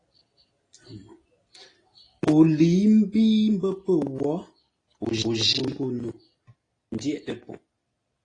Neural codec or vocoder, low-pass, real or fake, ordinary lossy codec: none; 9.9 kHz; real; AAC, 32 kbps